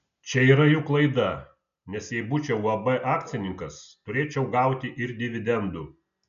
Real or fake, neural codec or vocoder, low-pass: real; none; 7.2 kHz